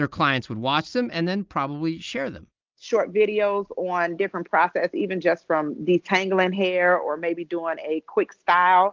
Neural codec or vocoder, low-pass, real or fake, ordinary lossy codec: none; 7.2 kHz; real; Opus, 32 kbps